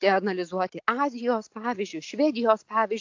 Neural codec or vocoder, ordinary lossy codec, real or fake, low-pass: none; AAC, 48 kbps; real; 7.2 kHz